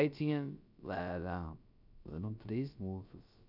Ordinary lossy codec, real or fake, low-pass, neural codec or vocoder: none; fake; 5.4 kHz; codec, 16 kHz, about 1 kbps, DyCAST, with the encoder's durations